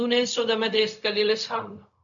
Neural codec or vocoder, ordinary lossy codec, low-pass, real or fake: codec, 16 kHz, 0.4 kbps, LongCat-Audio-Codec; MP3, 96 kbps; 7.2 kHz; fake